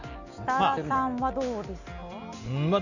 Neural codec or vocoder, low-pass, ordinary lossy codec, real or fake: none; 7.2 kHz; none; real